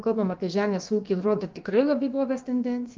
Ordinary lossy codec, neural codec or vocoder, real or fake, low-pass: Opus, 32 kbps; codec, 16 kHz, about 1 kbps, DyCAST, with the encoder's durations; fake; 7.2 kHz